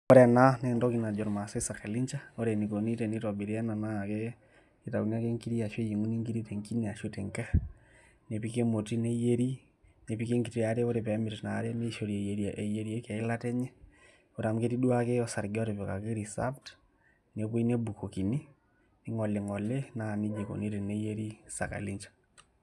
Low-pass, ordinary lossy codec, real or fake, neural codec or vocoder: none; none; real; none